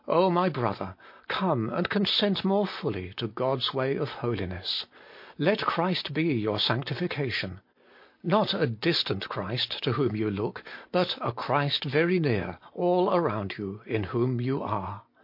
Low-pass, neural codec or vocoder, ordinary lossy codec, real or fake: 5.4 kHz; none; MP3, 32 kbps; real